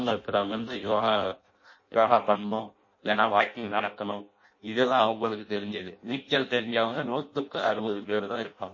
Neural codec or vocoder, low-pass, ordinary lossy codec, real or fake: codec, 16 kHz in and 24 kHz out, 0.6 kbps, FireRedTTS-2 codec; 7.2 kHz; MP3, 32 kbps; fake